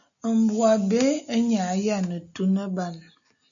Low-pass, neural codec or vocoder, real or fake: 7.2 kHz; none; real